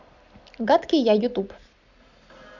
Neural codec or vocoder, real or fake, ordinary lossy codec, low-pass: none; real; none; 7.2 kHz